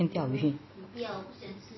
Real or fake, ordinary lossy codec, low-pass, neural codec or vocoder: real; MP3, 24 kbps; 7.2 kHz; none